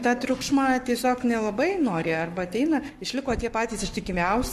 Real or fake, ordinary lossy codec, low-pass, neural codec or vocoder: fake; MP3, 64 kbps; 14.4 kHz; codec, 44.1 kHz, 7.8 kbps, Pupu-Codec